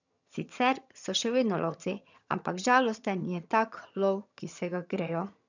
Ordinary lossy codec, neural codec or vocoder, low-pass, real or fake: none; vocoder, 22.05 kHz, 80 mel bands, HiFi-GAN; 7.2 kHz; fake